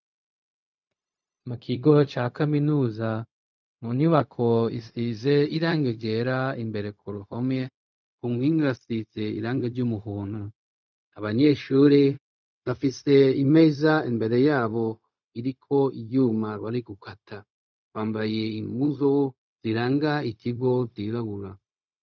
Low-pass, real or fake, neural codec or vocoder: 7.2 kHz; fake; codec, 16 kHz, 0.4 kbps, LongCat-Audio-Codec